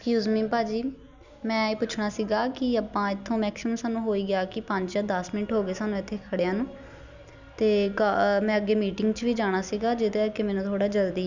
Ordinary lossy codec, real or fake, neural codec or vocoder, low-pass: none; real; none; 7.2 kHz